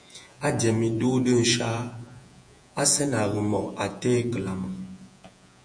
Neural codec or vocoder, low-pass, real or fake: vocoder, 48 kHz, 128 mel bands, Vocos; 9.9 kHz; fake